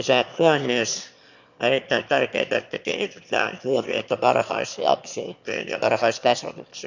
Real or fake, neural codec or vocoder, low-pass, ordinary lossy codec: fake; autoencoder, 22.05 kHz, a latent of 192 numbers a frame, VITS, trained on one speaker; 7.2 kHz; none